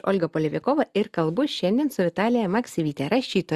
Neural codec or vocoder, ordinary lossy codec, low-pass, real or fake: none; Opus, 64 kbps; 14.4 kHz; real